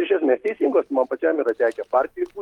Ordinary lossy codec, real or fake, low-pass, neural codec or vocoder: Opus, 24 kbps; real; 19.8 kHz; none